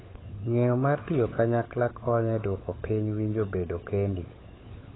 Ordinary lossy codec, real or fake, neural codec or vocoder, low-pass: AAC, 16 kbps; fake; codec, 16 kHz, 16 kbps, FunCodec, trained on LibriTTS, 50 frames a second; 7.2 kHz